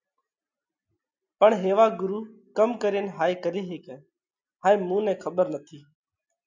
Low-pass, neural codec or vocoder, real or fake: 7.2 kHz; none; real